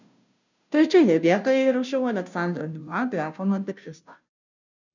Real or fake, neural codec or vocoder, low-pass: fake; codec, 16 kHz, 0.5 kbps, FunCodec, trained on Chinese and English, 25 frames a second; 7.2 kHz